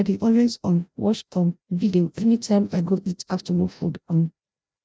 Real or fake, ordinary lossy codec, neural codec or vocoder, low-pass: fake; none; codec, 16 kHz, 0.5 kbps, FreqCodec, larger model; none